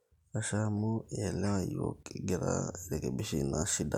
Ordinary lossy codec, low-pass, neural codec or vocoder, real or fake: none; 19.8 kHz; none; real